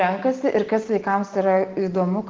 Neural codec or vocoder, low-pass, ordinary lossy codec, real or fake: none; 7.2 kHz; Opus, 16 kbps; real